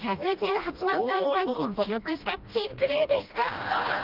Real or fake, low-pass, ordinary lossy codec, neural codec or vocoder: fake; 5.4 kHz; Opus, 32 kbps; codec, 16 kHz, 1 kbps, FreqCodec, smaller model